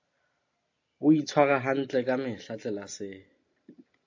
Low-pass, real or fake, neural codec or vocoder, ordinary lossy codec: 7.2 kHz; real; none; AAC, 48 kbps